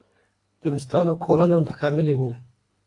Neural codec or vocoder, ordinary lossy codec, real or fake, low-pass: codec, 24 kHz, 1.5 kbps, HILCodec; AAC, 48 kbps; fake; 10.8 kHz